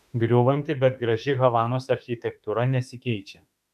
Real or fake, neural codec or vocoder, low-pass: fake; autoencoder, 48 kHz, 32 numbers a frame, DAC-VAE, trained on Japanese speech; 14.4 kHz